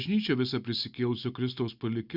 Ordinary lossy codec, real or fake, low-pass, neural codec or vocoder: Opus, 64 kbps; real; 5.4 kHz; none